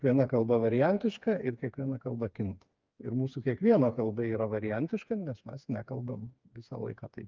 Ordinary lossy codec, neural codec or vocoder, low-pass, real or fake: Opus, 24 kbps; codec, 16 kHz, 4 kbps, FreqCodec, smaller model; 7.2 kHz; fake